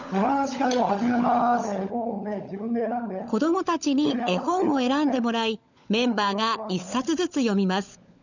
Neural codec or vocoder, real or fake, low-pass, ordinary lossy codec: codec, 16 kHz, 16 kbps, FunCodec, trained on LibriTTS, 50 frames a second; fake; 7.2 kHz; none